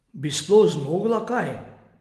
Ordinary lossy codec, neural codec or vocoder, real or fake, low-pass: Opus, 24 kbps; none; real; 14.4 kHz